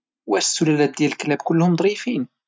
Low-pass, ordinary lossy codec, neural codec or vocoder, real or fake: none; none; none; real